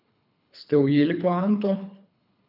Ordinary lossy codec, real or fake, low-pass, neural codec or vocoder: none; fake; 5.4 kHz; codec, 24 kHz, 3 kbps, HILCodec